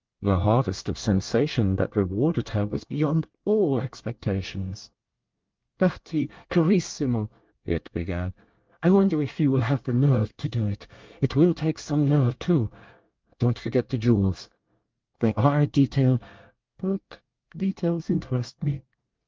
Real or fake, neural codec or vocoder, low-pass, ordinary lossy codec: fake; codec, 24 kHz, 1 kbps, SNAC; 7.2 kHz; Opus, 24 kbps